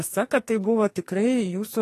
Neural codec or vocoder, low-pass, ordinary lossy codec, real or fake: codec, 44.1 kHz, 2.6 kbps, SNAC; 14.4 kHz; AAC, 48 kbps; fake